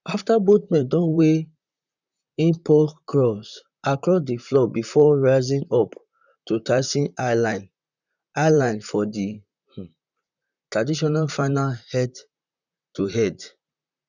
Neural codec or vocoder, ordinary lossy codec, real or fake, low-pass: vocoder, 44.1 kHz, 128 mel bands, Pupu-Vocoder; none; fake; 7.2 kHz